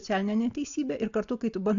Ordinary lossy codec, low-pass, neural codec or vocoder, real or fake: AAC, 64 kbps; 7.2 kHz; none; real